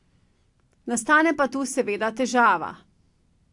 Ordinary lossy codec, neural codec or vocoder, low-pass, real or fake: AAC, 64 kbps; none; 10.8 kHz; real